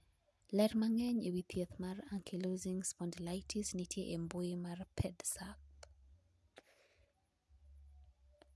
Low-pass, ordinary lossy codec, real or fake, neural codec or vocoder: none; none; real; none